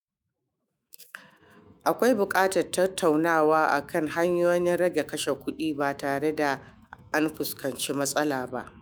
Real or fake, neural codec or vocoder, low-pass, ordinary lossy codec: fake; autoencoder, 48 kHz, 128 numbers a frame, DAC-VAE, trained on Japanese speech; none; none